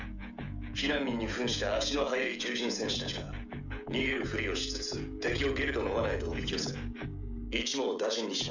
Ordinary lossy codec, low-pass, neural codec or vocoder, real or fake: none; 7.2 kHz; codec, 16 kHz, 16 kbps, FreqCodec, smaller model; fake